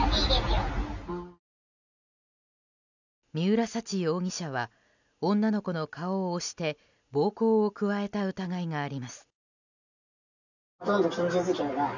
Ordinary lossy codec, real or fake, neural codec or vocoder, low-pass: none; real; none; 7.2 kHz